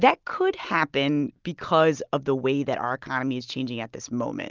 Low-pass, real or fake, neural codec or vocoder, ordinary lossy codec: 7.2 kHz; real; none; Opus, 24 kbps